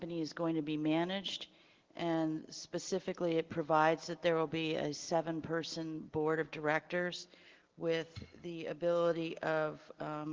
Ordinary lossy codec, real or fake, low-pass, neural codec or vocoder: Opus, 16 kbps; real; 7.2 kHz; none